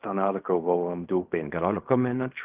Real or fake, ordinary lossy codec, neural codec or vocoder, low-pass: fake; Opus, 32 kbps; codec, 16 kHz in and 24 kHz out, 0.4 kbps, LongCat-Audio-Codec, fine tuned four codebook decoder; 3.6 kHz